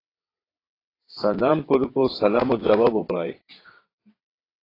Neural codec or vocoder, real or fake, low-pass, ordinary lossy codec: vocoder, 22.05 kHz, 80 mel bands, WaveNeXt; fake; 5.4 kHz; AAC, 24 kbps